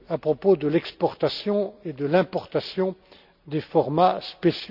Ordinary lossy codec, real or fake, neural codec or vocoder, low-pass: none; real; none; 5.4 kHz